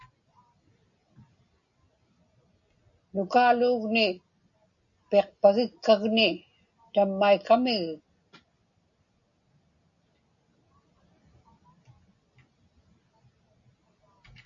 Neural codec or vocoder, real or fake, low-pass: none; real; 7.2 kHz